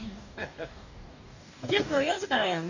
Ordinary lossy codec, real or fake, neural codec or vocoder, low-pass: none; fake; codec, 44.1 kHz, 2.6 kbps, DAC; 7.2 kHz